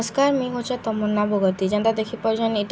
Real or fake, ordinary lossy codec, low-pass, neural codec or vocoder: real; none; none; none